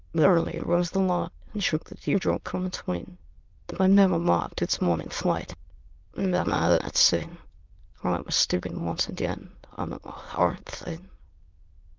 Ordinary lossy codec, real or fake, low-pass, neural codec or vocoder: Opus, 24 kbps; fake; 7.2 kHz; autoencoder, 22.05 kHz, a latent of 192 numbers a frame, VITS, trained on many speakers